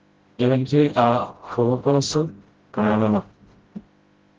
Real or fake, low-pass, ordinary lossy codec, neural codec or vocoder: fake; 7.2 kHz; Opus, 16 kbps; codec, 16 kHz, 0.5 kbps, FreqCodec, smaller model